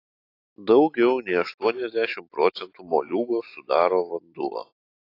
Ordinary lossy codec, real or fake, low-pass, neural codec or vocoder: AAC, 32 kbps; real; 5.4 kHz; none